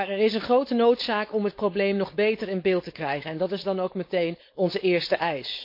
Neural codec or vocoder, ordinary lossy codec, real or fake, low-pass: codec, 16 kHz, 4.8 kbps, FACodec; MP3, 32 kbps; fake; 5.4 kHz